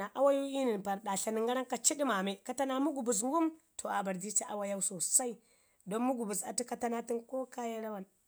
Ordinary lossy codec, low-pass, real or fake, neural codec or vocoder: none; none; real; none